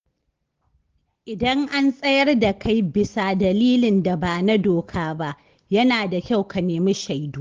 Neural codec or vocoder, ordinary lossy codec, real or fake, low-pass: none; Opus, 16 kbps; real; 7.2 kHz